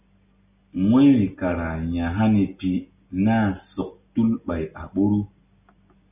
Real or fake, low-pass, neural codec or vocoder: real; 3.6 kHz; none